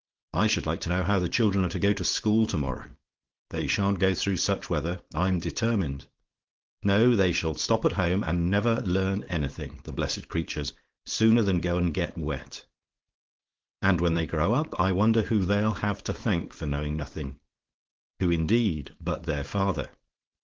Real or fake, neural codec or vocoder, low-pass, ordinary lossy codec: fake; codec, 16 kHz, 4.8 kbps, FACodec; 7.2 kHz; Opus, 16 kbps